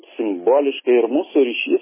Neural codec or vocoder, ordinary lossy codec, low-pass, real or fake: none; MP3, 16 kbps; 3.6 kHz; real